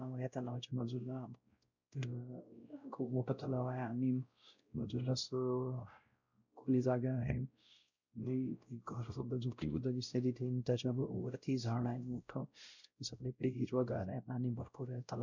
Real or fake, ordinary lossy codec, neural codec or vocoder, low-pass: fake; none; codec, 16 kHz, 0.5 kbps, X-Codec, WavLM features, trained on Multilingual LibriSpeech; 7.2 kHz